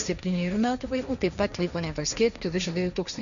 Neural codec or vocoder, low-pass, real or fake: codec, 16 kHz, 1.1 kbps, Voila-Tokenizer; 7.2 kHz; fake